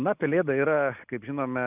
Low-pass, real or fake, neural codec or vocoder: 3.6 kHz; real; none